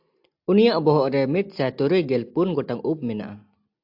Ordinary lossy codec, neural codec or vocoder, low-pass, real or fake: AAC, 48 kbps; none; 5.4 kHz; real